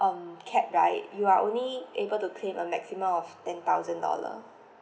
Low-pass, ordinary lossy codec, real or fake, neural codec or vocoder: none; none; real; none